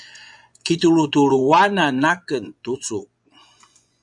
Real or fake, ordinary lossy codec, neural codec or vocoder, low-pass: fake; MP3, 96 kbps; vocoder, 24 kHz, 100 mel bands, Vocos; 10.8 kHz